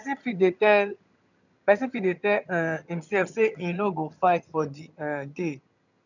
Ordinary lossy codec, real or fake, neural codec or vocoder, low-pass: none; fake; vocoder, 22.05 kHz, 80 mel bands, HiFi-GAN; 7.2 kHz